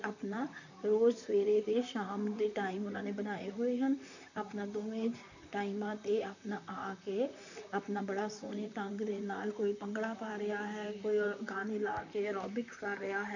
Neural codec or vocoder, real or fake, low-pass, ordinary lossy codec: vocoder, 44.1 kHz, 128 mel bands, Pupu-Vocoder; fake; 7.2 kHz; none